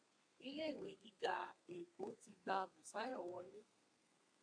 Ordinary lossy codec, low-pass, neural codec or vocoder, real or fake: AAC, 64 kbps; 9.9 kHz; codec, 32 kHz, 1.9 kbps, SNAC; fake